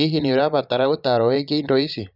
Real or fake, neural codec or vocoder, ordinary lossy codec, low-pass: fake; vocoder, 22.05 kHz, 80 mel bands, Vocos; none; 5.4 kHz